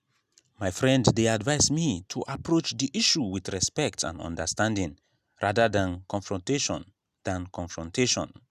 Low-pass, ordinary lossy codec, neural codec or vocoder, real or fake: 14.4 kHz; none; none; real